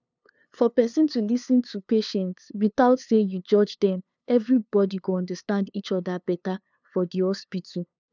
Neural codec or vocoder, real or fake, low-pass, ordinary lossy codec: codec, 16 kHz, 2 kbps, FunCodec, trained on LibriTTS, 25 frames a second; fake; 7.2 kHz; none